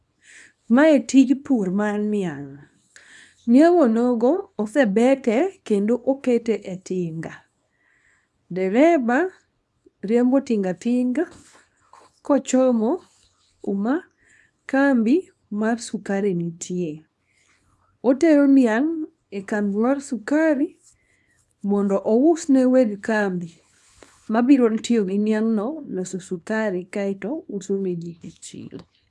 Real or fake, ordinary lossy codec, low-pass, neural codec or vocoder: fake; none; none; codec, 24 kHz, 0.9 kbps, WavTokenizer, small release